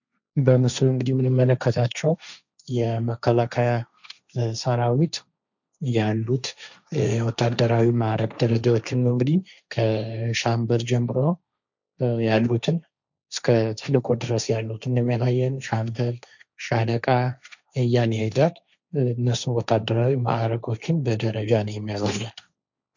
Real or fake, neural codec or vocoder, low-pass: fake; codec, 16 kHz, 1.1 kbps, Voila-Tokenizer; 7.2 kHz